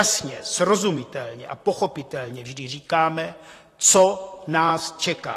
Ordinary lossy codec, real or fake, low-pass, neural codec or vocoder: AAC, 48 kbps; fake; 14.4 kHz; vocoder, 44.1 kHz, 128 mel bands, Pupu-Vocoder